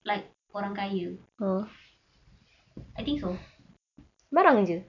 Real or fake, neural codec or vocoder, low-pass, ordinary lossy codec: real; none; 7.2 kHz; none